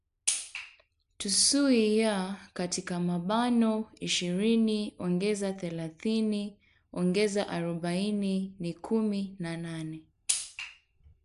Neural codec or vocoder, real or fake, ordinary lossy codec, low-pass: none; real; none; 10.8 kHz